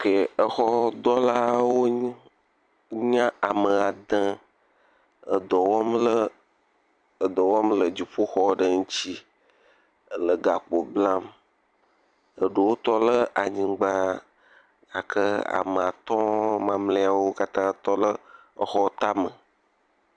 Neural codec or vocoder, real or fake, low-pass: vocoder, 44.1 kHz, 128 mel bands every 256 samples, BigVGAN v2; fake; 9.9 kHz